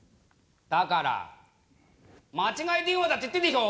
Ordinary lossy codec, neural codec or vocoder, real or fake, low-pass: none; none; real; none